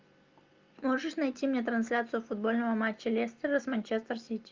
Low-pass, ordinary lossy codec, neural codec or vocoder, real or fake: 7.2 kHz; Opus, 24 kbps; none; real